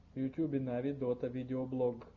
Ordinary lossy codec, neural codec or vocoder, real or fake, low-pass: Opus, 64 kbps; none; real; 7.2 kHz